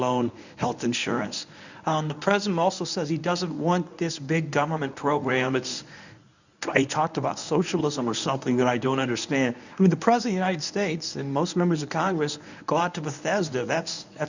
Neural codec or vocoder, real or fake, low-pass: codec, 24 kHz, 0.9 kbps, WavTokenizer, medium speech release version 1; fake; 7.2 kHz